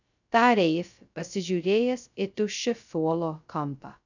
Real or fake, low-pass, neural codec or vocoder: fake; 7.2 kHz; codec, 16 kHz, 0.2 kbps, FocalCodec